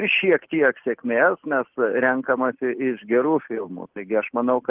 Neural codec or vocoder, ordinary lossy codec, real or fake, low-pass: none; Opus, 16 kbps; real; 3.6 kHz